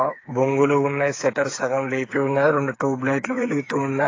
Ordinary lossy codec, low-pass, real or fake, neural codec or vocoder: AAC, 32 kbps; 7.2 kHz; fake; codec, 16 kHz, 8 kbps, FreqCodec, smaller model